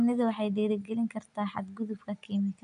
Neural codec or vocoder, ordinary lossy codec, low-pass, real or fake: none; none; 9.9 kHz; real